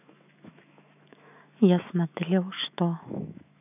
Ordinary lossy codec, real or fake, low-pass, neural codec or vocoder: none; fake; 3.6 kHz; autoencoder, 48 kHz, 128 numbers a frame, DAC-VAE, trained on Japanese speech